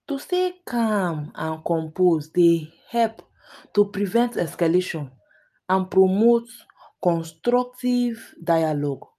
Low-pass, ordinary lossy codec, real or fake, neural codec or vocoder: 14.4 kHz; MP3, 96 kbps; real; none